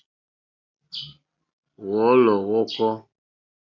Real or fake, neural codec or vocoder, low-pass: real; none; 7.2 kHz